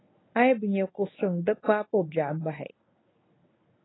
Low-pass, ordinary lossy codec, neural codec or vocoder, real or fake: 7.2 kHz; AAC, 16 kbps; codec, 24 kHz, 0.9 kbps, WavTokenizer, medium speech release version 1; fake